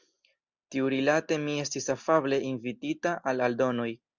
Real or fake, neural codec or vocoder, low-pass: real; none; 7.2 kHz